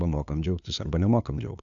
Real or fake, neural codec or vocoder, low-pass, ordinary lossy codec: fake; codec, 16 kHz, 2 kbps, FunCodec, trained on LibriTTS, 25 frames a second; 7.2 kHz; AAC, 64 kbps